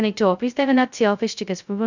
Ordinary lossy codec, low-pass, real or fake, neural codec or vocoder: none; 7.2 kHz; fake; codec, 16 kHz, 0.2 kbps, FocalCodec